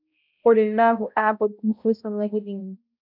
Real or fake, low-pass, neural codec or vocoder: fake; 5.4 kHz; codec, 16 kHz, 0.5 kbps, X-Codec, HuBERT features, trained on balanced general audio